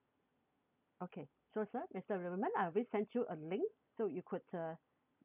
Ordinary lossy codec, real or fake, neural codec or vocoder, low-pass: none; real; none; 3.6 kHz